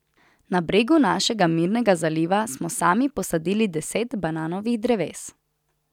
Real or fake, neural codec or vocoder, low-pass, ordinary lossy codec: real; none; 19.8 kHz; none